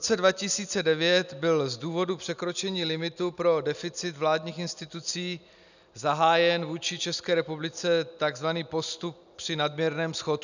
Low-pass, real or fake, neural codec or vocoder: 7.2 kHz; real; none